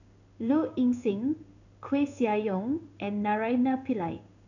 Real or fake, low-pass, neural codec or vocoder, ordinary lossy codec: fake; 7.2 kHz; codec, 16 kHz in and 24 kHz out, 1 kbps, XY-Tokenizer; none